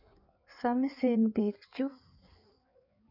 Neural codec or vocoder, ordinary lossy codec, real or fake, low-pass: codec, 16 kHz in and 24 kHz out, 1.1 kbps, FireRedTTS-2 codec; none; fake; 5.4 kHz